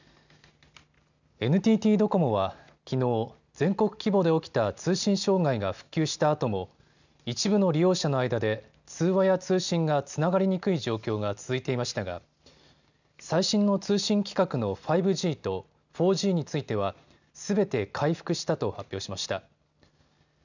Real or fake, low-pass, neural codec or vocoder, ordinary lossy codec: real; 7.2 kHz; none; none